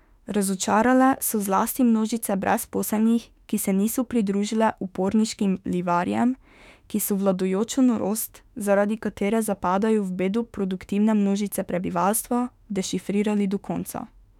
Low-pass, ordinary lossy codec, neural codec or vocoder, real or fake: 19.8 kHz; none; autoencoder, 48 kHz, 32 numbers a frame, DAC-VAE, trained on Japanese speech; fake